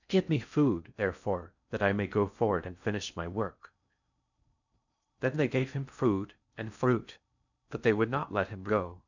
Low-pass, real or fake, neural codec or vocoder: 7.2 kHz; fake; codec, 16 kHz in and 24 kHz out, 0.6 kbps, FocalCodec, streaming, 4096 codes